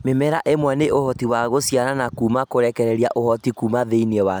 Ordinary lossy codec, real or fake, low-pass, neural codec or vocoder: none; real; none; none